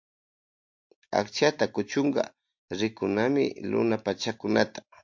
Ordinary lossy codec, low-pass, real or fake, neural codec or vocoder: MP3, 48 kbps; 7.2 kHz; real; none